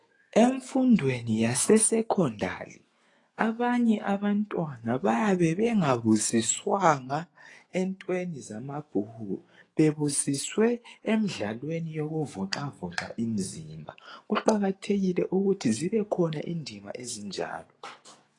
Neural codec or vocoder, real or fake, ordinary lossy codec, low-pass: autoencoder, 48 kHz, 128 numbers a frame, DAC-VAE, trained on Japanese speech; fake; AAC, 32 kbps; 10.8 kHz